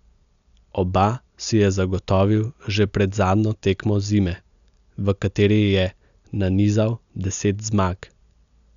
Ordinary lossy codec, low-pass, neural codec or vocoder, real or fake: none; 7.2 kHz; none; real